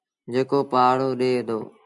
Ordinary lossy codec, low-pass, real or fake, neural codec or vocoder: MP3, 96 kbps; 10.8 kHz; real; none